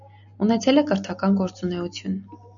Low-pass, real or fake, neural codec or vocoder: 7.2 kHz; real; none